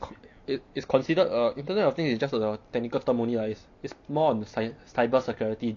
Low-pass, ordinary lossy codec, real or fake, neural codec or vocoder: 7.2 kHz; MP3, 48 kbps; real; none